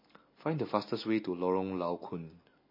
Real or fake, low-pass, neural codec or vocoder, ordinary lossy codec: real; 5.4 kHz; none; MP3, 24 kbps